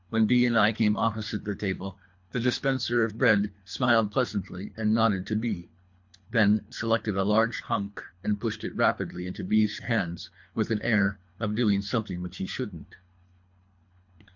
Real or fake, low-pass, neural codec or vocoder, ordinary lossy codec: fake; 7.2 kHz; codec, 24 kHz, 3 kbps, HILCodec; MP3, 48 kbps